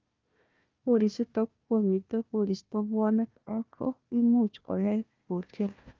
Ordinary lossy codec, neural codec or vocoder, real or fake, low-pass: Opus, 24 kbps; codec, 16 kHz, 1 kbps, FunCodec, trained on Chinese and English, 50 frames a second; fake; 7.2 kHz